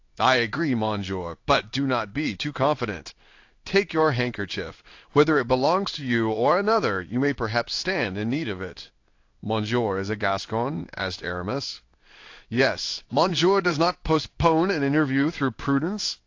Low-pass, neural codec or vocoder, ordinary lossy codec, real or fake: 7.2 kHz; codec, 16 kHz in and 24 kHz out, 1 kbps, XY-Tokenizer; AAC, 48 kbps; fake